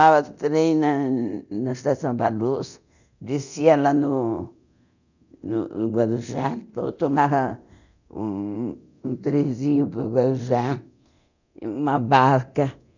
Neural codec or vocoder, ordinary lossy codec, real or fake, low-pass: codec, 24 kHz, 0.9 kbps, DualCodec; none; fake; 7.2 kHz